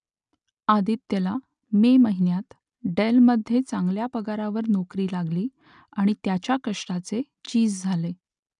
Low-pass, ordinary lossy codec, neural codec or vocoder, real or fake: 10.8 kHz; none; none; real